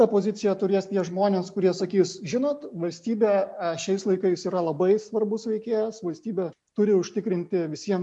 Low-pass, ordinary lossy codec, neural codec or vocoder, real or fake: 10.8 kHz; MP3, 64 kbps; none; real